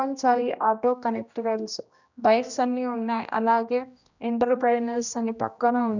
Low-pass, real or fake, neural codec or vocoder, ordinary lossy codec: 7.2 kHz; fake; codec, 16 kHz, 1 kbps, X-Codec, HuBERT features, trained on general audio; none